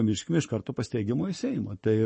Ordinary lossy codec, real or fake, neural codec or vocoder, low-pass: MP3, 32 kbps; fake; codec, 44.1 kHz, 7.8 kbps, Pupu-Codec; 10.8 kHz